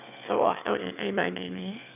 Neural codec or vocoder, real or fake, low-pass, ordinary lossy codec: autoencoder, 22.05 kHz, a latent of 192 numbers a frame, VITS, trained on one speaker; fake; 3.6 kHz; none